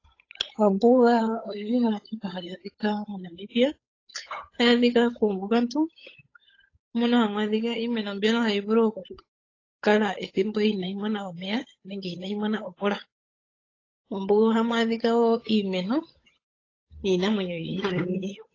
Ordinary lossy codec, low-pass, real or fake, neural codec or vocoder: AAC, 32 kbps; 7.2 kHz; fake; codec, 16 kHz, 8 kbps, FunCodec, trained on Chinese and English, 25 frames a second